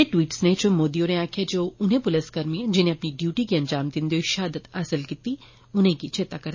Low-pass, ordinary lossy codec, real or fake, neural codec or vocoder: 7.2 kHz; MP3, 32 kbps; real; none